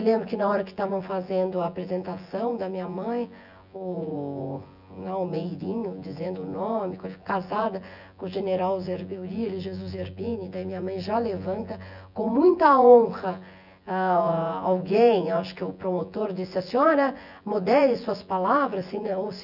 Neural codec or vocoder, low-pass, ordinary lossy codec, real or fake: vocoder, 24 kHz, 100 mel bands, Vocos; 5.4 kHz; none; fake